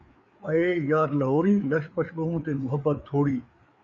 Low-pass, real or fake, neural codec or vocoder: 7.2 kHz; fake; codec, 16 kHz, 4 kbps, FreqCodec, larger model